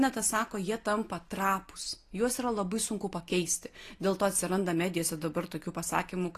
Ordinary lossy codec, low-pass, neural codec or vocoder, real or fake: AAC, 48 kbps; 14.4 kHz; none; real